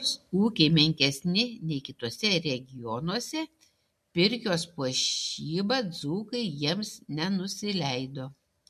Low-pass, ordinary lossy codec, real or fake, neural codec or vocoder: 14.4 kHz; MP3, 64 kbps; real; none